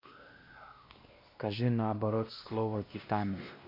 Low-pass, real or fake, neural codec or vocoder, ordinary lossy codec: 5.4 kHz; fake; codec, 16 kHz, 1 kbps, X-Codec, WavLM features, trained on Multilingual LibriSpeech; MP3, 32 kbps